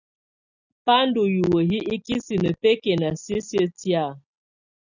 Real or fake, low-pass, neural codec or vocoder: real; 7.2 kHz; none